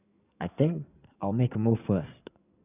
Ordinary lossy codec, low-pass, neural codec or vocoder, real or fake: none; 3.6 kHz; codec, 16 kHz in and 24 kHz out, 2.2 kbps, FireRedTTS-2 codec; fake